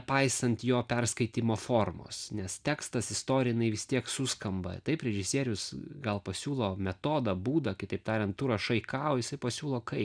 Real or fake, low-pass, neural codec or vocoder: real; 9.9 kHz; none